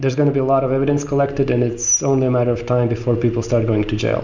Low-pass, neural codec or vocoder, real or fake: 7.2 kHz; none; real